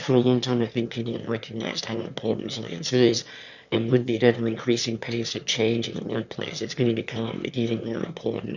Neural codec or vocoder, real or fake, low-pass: autoencoder, 22.05 kHz, a latent of 192 numbers a frame, VITS, trained on one speaker; fake; 7.2 kHz